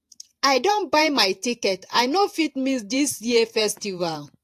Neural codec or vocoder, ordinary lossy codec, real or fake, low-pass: vocoder, 48 kHz, 128 mel bands, Vocos; AAC, 64 kbps; fake; 14.4 kHz